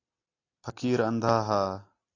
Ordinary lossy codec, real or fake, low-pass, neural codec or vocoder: AAC, 32 kbps; real; 7.2 kHz; none